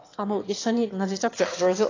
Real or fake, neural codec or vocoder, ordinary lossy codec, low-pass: fake; autoencoder, 22.05 kHz, a latent of 192 numbers a frame, VITS, trained on one speaker; AAC, 32 kbps; 7.2 kHz